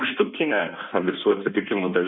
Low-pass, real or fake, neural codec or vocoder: 7.2 kHz; fake; codec, 16 kHz in and 24 kHz out, 1.1 kbps, FireRedTTS-2 codec